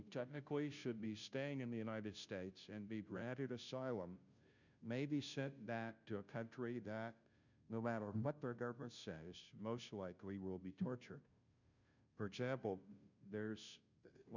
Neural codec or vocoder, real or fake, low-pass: codec, 16 kHz, 0.5 kbps, FunCodec, trained on Chinese and English, 25 frames a second; fake; 7.2 kHz